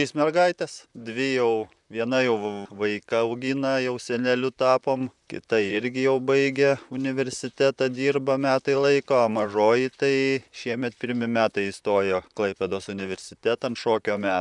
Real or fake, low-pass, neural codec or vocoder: fake; 10.8 kHz; vocoder, 44.1 kHz, 128 mel bands, Pupu-Vocoder